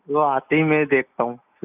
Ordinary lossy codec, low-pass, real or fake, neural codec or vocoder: none; 3.6 kHz; real; none